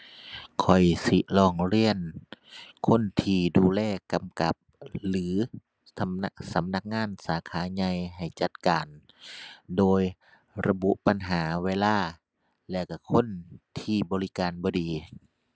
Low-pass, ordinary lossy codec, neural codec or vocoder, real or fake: none; none; none; real